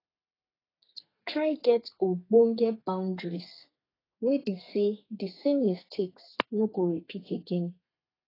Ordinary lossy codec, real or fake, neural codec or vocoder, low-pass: AAC, 24 kbps; fake; codec, 32 kHz, 1.9 kbps, SNAC; 5.4 kHz